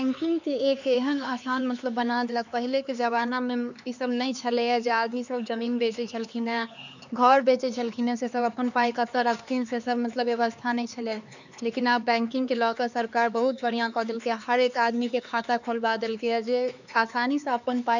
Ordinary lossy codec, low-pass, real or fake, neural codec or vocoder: none; 7.2 kHz; fake; codec, 16 kHz, 4 kbps, X-Codec, HuBERT features, trained on LibriSpeech